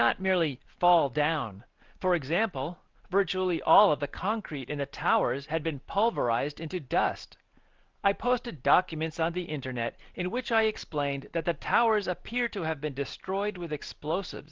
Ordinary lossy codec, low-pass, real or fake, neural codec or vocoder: Opus, 32 kbps; 7.2 kHz; real; none